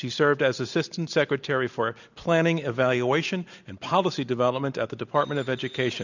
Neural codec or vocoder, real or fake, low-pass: none; real; 7.2 kHz